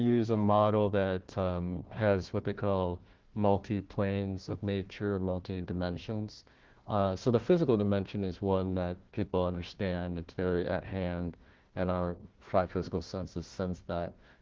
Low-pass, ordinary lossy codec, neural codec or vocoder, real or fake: 7.2 kHz; Opus, 16 kbps; codec, 16 kHz, 1 kbps, FunCodec, trained on Chinese and English, 50 frames a second; fake